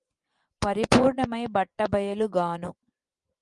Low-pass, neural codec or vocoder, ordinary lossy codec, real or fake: 10.8 kHz; vocoder, 24 kHz, 100 mel bands, Vocos; Opus, 32 kbps; fake